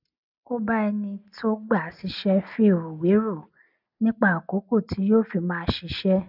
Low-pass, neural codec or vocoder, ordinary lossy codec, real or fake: 5.4 kHz; none; none; real